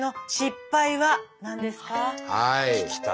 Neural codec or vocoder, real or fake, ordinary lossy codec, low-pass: none; real; none; none